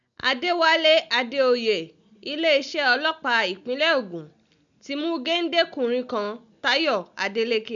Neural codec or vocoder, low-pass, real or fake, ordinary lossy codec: none; 7.2 kHz; real; none